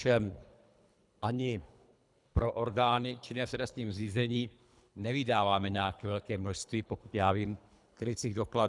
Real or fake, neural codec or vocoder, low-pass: fake; codec, 24 kHz, 3 kbps, HILCodec; 10.8 kHz